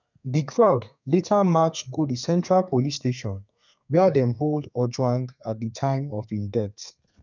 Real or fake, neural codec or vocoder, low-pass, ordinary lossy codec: fake; codec, 32 kHz, 1.9 kbps, SNAC; 7.2 kHz; none